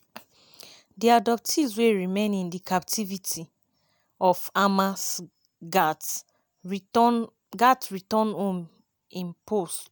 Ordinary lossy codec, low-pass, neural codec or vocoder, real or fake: none; none; none; real